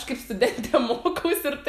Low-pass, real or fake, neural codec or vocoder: 14.4 kHz; real; none